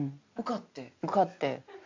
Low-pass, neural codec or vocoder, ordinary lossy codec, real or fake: 7.2 kHz; none; AAC, 48 kbps; real